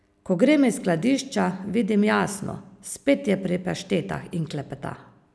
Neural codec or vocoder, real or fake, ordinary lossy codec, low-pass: none; real; none; none